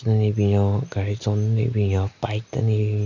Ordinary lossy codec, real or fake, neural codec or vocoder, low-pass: none; real; none; 7.2 kHz